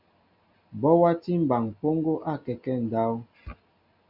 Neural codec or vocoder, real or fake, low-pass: none; real; 5.4 kHz